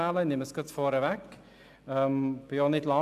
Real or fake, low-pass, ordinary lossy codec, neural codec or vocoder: real; 14.4 kHz; AAC, 96 kbps; none